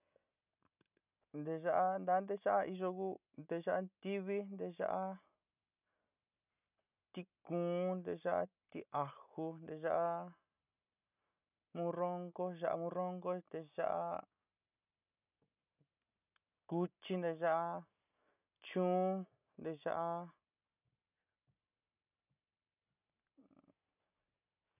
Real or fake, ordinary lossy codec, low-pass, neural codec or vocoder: real; none; 3.6 kHz; none